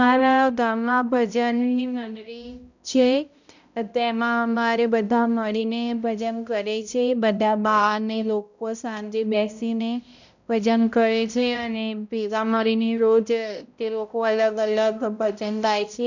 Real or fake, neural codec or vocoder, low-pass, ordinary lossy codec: fake; codec, 16 kHz, 0.5 kbps, X-Codec, HuBERT features, trained on balanced general audio; 7.2 kHz; none